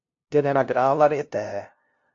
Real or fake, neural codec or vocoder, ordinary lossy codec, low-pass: fake; codec, 16 kHz, 0.5 kbps, FunCodec, trained on LibriTTS, 25 frames a second; AAC, 48 kbps; 7.2 kHz